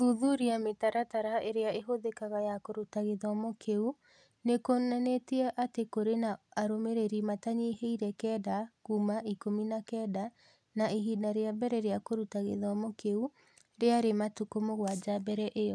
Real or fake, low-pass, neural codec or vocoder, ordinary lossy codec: real; none; none; none